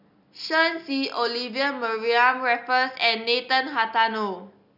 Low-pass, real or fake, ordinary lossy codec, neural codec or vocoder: 5.4 kHz; real; none; none